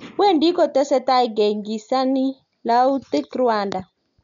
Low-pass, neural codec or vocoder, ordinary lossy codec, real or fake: 7.2 kHz; none; none; real